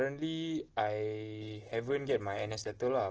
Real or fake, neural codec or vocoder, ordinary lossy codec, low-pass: real; none; Opus, 16 kbps; 7.2 kHz